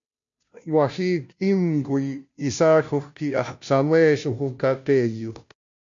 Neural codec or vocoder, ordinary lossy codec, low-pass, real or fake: codec, 16 kHz, 0.5 kbps, FunCodec, trained on Chinese and English, 25 frames a second; MP3, 64 kbps; 7.2 kHz; fake